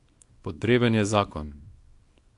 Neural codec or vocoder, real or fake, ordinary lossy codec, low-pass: codec, 24 kHz, 0.9 kbps, WavTokenizer, small release; fake; AAC, 64 kbps; 10.8 kHz